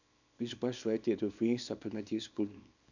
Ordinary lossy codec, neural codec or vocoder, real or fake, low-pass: none; codec, 24 kHz, 0.9 kbps, WavTokenizer, small release; fake; 7.2 kHz